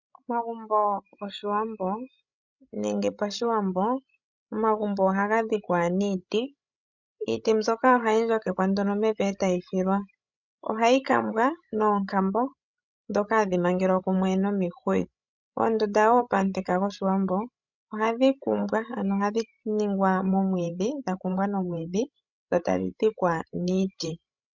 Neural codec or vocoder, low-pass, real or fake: codec, 16 kHz, 16 kbps, FreqCodec, larger model; 7.2 kHz; fake